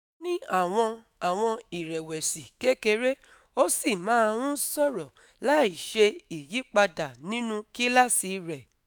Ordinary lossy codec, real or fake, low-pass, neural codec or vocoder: none; fake; none; autoencoder, 48 kHz, 128 numbers a frame, DAC-VAE, trained on Japanese speech